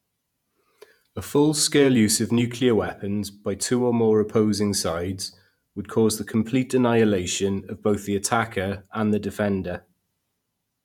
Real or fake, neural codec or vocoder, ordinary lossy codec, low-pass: fake; vocoder, 48 kHz, 128 mel bands, Vocos; none; 19.8 kHz